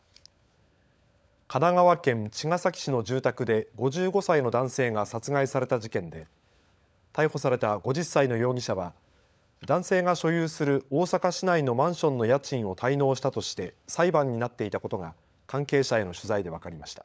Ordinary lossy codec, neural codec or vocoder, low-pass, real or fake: none; codec, 16 kHz, 16 kbps, FunCodec, trained on LibriTTS, 50 frames a second; none; fake